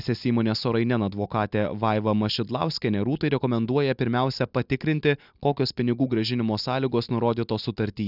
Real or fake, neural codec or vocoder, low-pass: real; none; 5.4 kHz